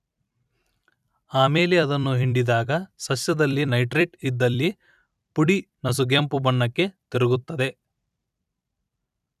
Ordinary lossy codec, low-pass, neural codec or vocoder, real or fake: none; 14.4 kHz; vocoder, 44.1 kHz, 128 mel bands every 256 samples, BigVGAN v2; fake